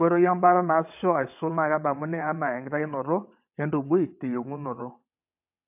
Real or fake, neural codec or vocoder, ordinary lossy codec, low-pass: fake; vocoder, 22.05 kHz, 80 mel bands, WaveNeXt; AAC, 32 kbps; 3.6 kHz